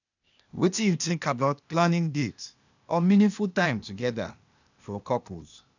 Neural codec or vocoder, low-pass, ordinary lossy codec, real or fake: codec, 16 kHz, 0.8 kbps, ZipCodec; 7.2 kHz; none; fake